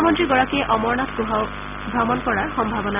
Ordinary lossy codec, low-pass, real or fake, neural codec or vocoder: none; 3.6 kHz; real; none